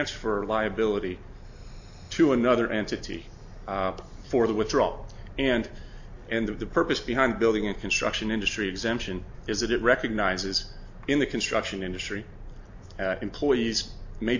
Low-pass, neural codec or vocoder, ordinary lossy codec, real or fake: 7.2 kHz; none; AAC, 48 kbps; real